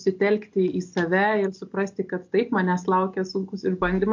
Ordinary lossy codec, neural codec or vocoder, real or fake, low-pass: MP3, 48 kbps; none; real; 7.2 kHz